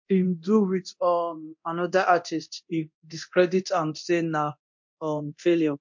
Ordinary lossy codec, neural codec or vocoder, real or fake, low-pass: MP3, 48 kbps; codec, 24 kHz, 0.9 kbps, DualCodec; fake; 7.2 kHz